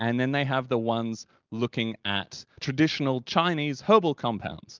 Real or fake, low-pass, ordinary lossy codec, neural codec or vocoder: real; 7.2 kHz; Opus, 24 kbps; none